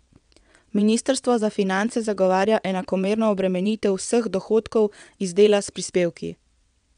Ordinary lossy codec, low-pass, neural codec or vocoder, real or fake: none; 9.9 kHz; vocoder, 22.05 kHz, 80 mel bands, WaveNeXt; fake